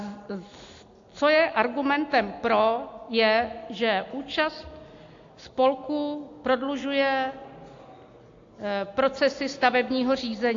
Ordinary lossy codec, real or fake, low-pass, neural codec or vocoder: AAC, 48 kbps; real; 7.2 kHz; none